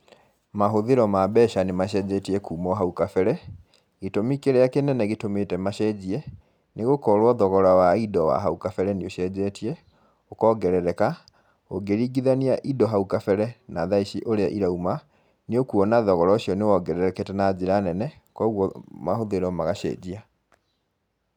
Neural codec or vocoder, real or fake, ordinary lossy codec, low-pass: none; real; none; 19.8 kHz